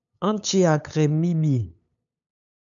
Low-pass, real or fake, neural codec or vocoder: 7.2 kHz; fake; codec, 16 kHz, 8 kbps, FunCodec, trained on LibriTTS, 25 frames a second